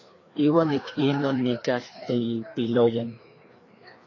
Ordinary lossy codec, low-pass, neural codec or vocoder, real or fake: AAC, 32 kbps; 7.2 kHz; codec, 16 kHz, 2 kbps, FreqCodec, larger model; fake